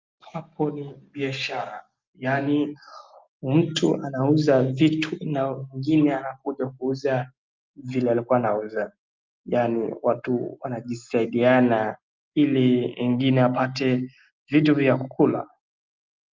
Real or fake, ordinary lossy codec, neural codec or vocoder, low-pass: real; Opus, 24 kbps; none; 7.2 kHz